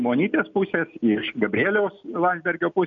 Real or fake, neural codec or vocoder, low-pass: real; none; 7.2 kHz